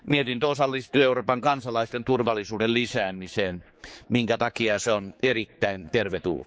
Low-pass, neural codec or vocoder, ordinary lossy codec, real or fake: none; codec, 16 kHz, 4 kbps, X-Codec, HuBERT features, trained on general audio; none; fake